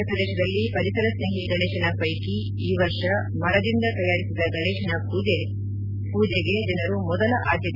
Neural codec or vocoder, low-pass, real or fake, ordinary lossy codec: none; 5.4 kHz; real; none